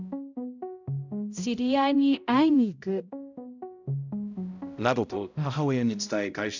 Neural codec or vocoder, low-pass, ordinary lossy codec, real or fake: codec, 16 kHz, 0.5 kbps, X-Codec, HuBERT features, trained on balanced general audio; 7.2 kHz; none; fake